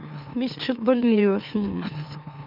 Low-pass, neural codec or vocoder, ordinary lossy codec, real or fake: 5.4 kHz; autoencoder, 44.1 kHz, a latent of 192 numbers a frame, MeloTTS; none; fake